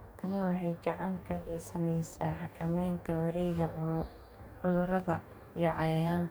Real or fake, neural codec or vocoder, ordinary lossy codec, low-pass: fake; codec, 44.1 kHz, 2.6 kbps, DAC; none; none